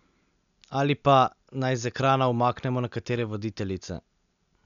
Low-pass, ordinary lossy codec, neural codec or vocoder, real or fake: 7.2 kHz; none; none; real